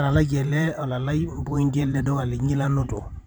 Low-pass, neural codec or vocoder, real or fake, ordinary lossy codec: none; vocoder, 44.1 kHz, 128 mel bands every 512 samples, BigVGAN v2; fake; none